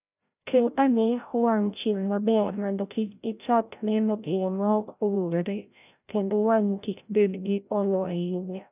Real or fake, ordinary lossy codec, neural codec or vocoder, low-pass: fake; none; codec, 16 kHz, 0.5 kbps, FreqCodec, larger model; 3.6 kHz